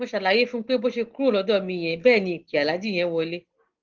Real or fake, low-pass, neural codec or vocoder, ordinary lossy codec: real; 7.2 kHz; none; Opus, 16 kbps